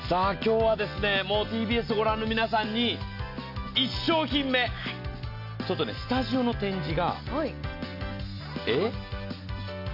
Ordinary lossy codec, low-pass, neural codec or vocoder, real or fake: none; 5.4 kHz; none; real